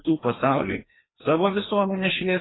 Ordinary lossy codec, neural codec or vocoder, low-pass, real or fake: AAC, 16 kbps; codec, 16 kHz, 2 kbps, FreqCodec, larger model; 7.2 kHz; fake